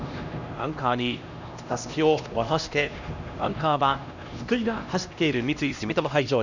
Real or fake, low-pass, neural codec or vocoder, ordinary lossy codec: fake; 7.2 kHz; codec, 16 kHz, 1 kbps, X-Codec, HuBERT features, trained on LibriSpeech; none